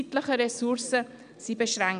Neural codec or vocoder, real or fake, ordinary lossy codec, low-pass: none; real; none; 9.9 kHz